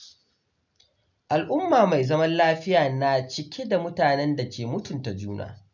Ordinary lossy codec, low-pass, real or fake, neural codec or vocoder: none; 7.2 kHz; real; none